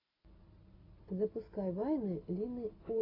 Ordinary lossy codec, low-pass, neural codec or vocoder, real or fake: AAC, 24 kbps; 5.4 kHz; none; real